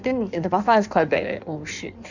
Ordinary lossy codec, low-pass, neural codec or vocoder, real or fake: none; 7.2 kHz; codec, 16 kHz in and 24 kHz out, 1.1 kbps, FireRedTTS-2 codec; fake